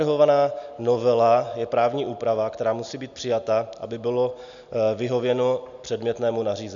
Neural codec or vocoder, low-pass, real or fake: none; 7.2 kHz; real